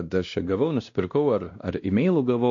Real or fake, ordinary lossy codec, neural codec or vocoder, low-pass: fake; MP3, 48 kbps; codec, 16 kHz, 1 kbps, X-Codec, WavLM features, trained on Multilingual LibriSpeech; 7.2 kHz